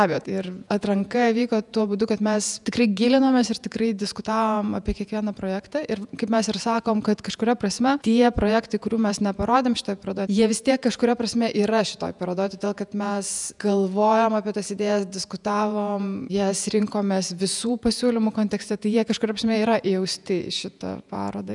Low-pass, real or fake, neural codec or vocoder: 10.8 kHz; fake; vocoder, 48 kHz, 128 mel bands, Vocos